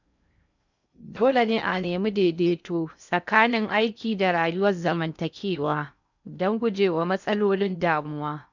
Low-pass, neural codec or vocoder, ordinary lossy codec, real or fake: 7.2 kHz; codec, 16 kHz in and 24 kHz out, 0.6 kbps, FocalCodec, streaming, 2048 codes; none; fake